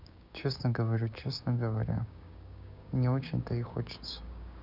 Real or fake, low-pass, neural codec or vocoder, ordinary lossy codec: fake; 5.4 kHz; codec, 16 kHz, 6 kbps, DAC; none